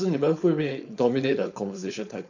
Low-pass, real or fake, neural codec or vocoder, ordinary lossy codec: 7.2 kHz; fake; codec, 16 kHz, 4.8 kbps, FACodec; none